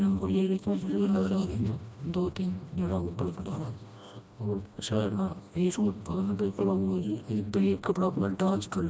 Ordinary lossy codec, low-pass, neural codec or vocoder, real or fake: none; none; codec, 16 kHz, 1 kbps, FreqCodec, smaller model; fake